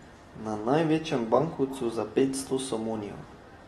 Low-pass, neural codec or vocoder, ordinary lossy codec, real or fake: 19.8 kHz; none; AAC, 32 kbps; real